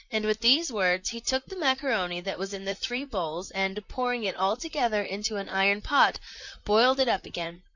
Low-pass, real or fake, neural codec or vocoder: 7.2 kHz; fake; vocoder, 44.1 kHz, 128 mel bands, Pupu-Vocoder